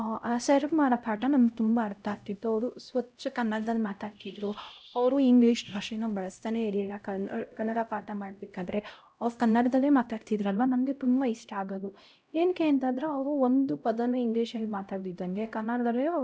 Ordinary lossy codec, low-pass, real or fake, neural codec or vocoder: none; none; fake; codec, 16 kHz, 0.5 kbps, X-Codec, HuBERT features, trained on LibriSpeech